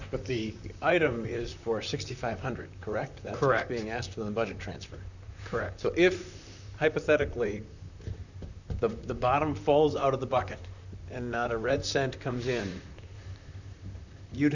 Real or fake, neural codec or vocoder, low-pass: fake; vocoder, 44.1 kHz, 128 mel bands, Pupu-Vocoder; 7.2 kHz